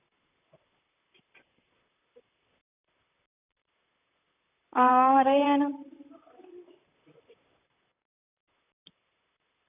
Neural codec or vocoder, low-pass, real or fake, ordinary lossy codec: vocoder, 44.1 kHz, 128 mel bands, Pupu-Vocoder; 3.6 kHz; fake; none